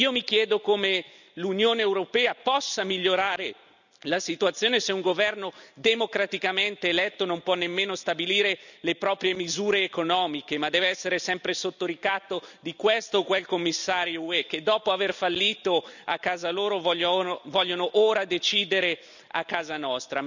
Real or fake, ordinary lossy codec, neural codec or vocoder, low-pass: real; none; none; 7.2 kHz